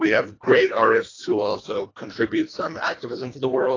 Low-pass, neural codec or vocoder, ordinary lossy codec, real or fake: 7.2 kHz; codec, 24 kHz, 1.5 kbps, HILCodec; AAC, 32 kbps; fake